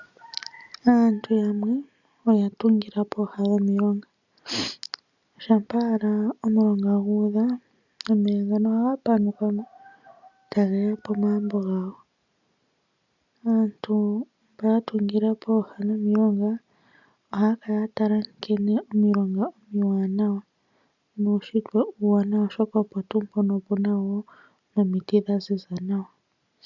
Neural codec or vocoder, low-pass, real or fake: none; 7.2 kHz; real